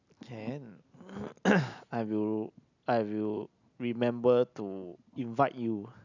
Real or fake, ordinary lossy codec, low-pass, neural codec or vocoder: real; none; 7.2 kHz; none